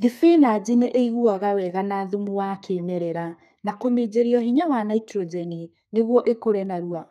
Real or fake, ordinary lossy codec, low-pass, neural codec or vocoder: fake; none; 14.4 kHz; codec, 32 kHz, 1.9 kbps, SNAC